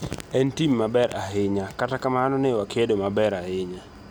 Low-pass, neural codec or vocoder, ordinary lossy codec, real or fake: none; none; none; real